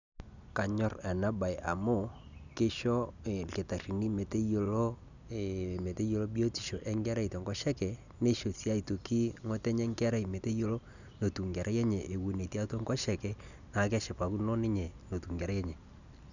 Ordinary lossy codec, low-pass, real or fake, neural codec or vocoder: none; 7.2 kHz; real; none